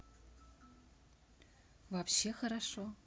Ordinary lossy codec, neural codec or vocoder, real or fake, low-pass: none; none; real; none